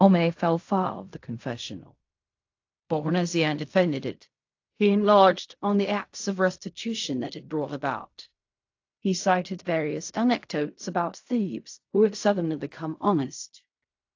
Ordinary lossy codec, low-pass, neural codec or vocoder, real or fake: AAC, 48 kbps; 7.2 kHz; codec, 16 kHz in and 24 kHz out, 0.4 kbps, LongCat-Audio-Codec, fine tuned four codebook decoder; fake